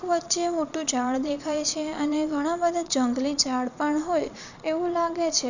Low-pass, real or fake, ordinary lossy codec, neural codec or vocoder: 7.2 kHz; fake; none; vocoder, 22.05 kHz, 80 mel bands, Vocos